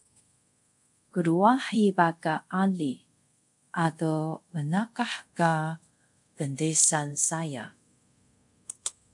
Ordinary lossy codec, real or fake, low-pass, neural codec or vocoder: MP3, 64 kbps; fake; 10.8 kHz; codec, 24 kHz, 0.5 kbps, DualCodec